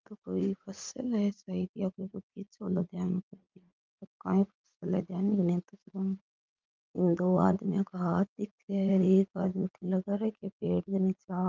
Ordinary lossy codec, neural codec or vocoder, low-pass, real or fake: Opus, 24 kbps; vocoder, 44.1 kHz, 80 mel bands, Vocos; 7.2 kHz; fake